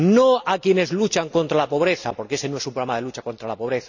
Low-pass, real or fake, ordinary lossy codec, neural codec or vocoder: 7.2 kHz; real; none; none